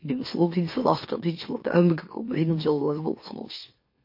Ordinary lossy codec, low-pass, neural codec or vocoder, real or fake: MP3, 32 kbps; 5.4 kHz; autoencoder, 44.1 kHz, a latent of 192 numbers a frame, MeloTTS; fake